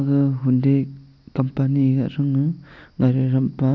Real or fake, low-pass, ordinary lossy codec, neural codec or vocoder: real; 7.2 kHz; none; none